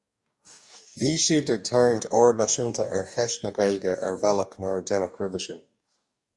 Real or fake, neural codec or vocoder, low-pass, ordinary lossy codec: fake; codec, 44.1 kHz, 2.6 kbps, DAC; 10.8 kHz; Opus, 64 kbps